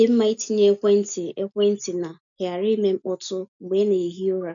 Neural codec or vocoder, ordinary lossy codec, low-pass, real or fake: none; none; 7.2 kHz; real